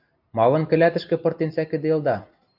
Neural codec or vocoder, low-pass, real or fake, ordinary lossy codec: none; 5.4 kHz; real; AAC, 48 kbps